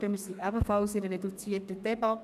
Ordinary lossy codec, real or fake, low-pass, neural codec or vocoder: none; fake; 14.4 kHz; codec, 32 kHz, 1.9 kbps, SNAC